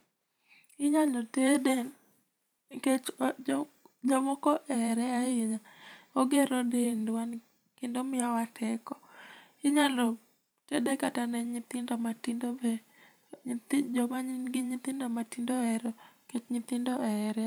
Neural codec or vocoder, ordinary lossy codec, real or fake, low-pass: vocoder, 44.1 kHz, 128 mel bands every 512 samples, BigVGAN v2; none; fake; none